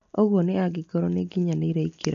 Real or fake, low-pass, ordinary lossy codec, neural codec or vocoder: real; 7.2 kHz; MP3, 48 kbps; none